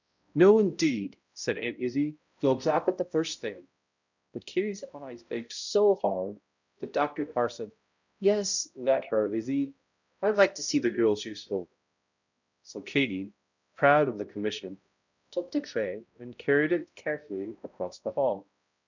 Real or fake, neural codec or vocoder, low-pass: fake; codec, 16 kHz, 0.5 kbps, X-Codec, HuBERT features, trained on balanced general audio; 7.2 kHz